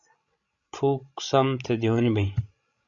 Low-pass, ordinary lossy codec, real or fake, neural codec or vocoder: 7.2 kHz; AAC, 64 kbps; fake; codec, 16 kHz, 16 kbps, FreqCodec, larger model